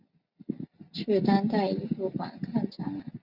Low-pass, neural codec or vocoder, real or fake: 5.4 kHz; none; real